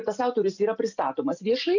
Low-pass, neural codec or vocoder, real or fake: 7.2 kHz; none; real